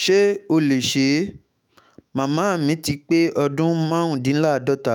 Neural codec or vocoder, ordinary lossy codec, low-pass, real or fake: autoencoder, 48 kHz, 128 numbers a frame, DAC-VAE, trained on Japanese speech; none; 19.8 kHz; fake